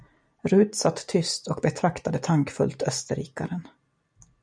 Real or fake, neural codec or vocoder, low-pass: real; none; 9.9 kHz